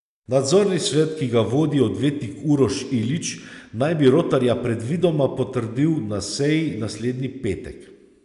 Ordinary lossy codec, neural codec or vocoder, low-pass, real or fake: none; none; 10.8 kHz; real